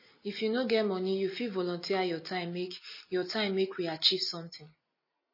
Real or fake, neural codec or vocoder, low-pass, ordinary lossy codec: real; none; 5.4 kHz; MP3, 24 kbps